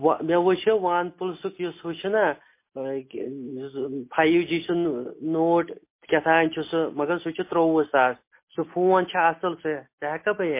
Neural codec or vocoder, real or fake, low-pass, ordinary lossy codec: none; real; 3.6 kHz; MP3, 24 kbps